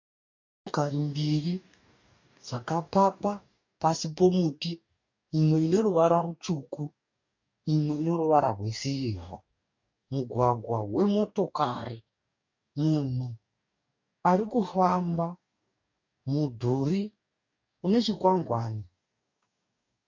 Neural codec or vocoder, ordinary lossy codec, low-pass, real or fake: codec, 44.1 kHz, 2.6 kbps, DAC; MP3, 48 kbps; 7.2 kHz; fake